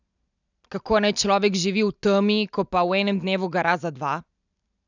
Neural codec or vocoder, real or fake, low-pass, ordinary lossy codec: none; real; 7.2 kHz; none